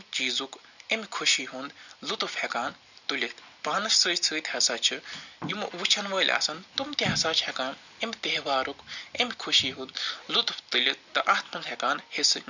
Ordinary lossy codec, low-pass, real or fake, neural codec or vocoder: none; 7.2 kHz; real; none